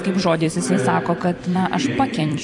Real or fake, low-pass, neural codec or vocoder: fake; 10.8 kHz; vocoder, 44.1 kHz, 128 mel bands every 256 samples, BigVGAN v2